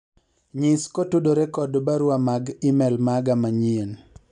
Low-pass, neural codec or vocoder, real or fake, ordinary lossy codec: 9.9 kHz; none; real; none